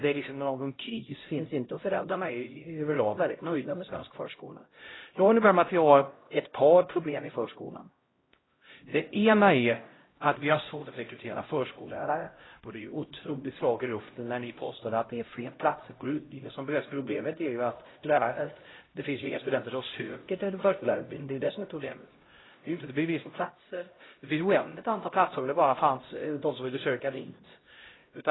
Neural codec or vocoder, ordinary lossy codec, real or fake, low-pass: codec, 16 kHz, 0.5 kbps, X-Codec, HuBERT features, trained on LibriSpeech; AAC, 16 kbps; fake; 7.2 kHz